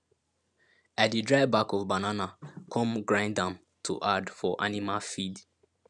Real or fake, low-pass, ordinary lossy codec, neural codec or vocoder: fake; 10.8 kHz; none; vocoder, 44.1 kHz, 128 mel bands every 512 samples, BigVGAN v2